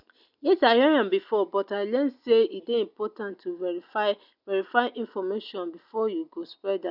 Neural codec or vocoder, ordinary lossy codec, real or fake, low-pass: none; none; real; 5.4 kHz